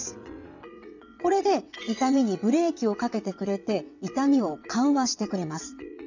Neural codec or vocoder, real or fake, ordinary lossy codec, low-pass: vocoder, 22.05 kHz, 80 mel bands, WaveNeXt; fake; none; 7.2 kHz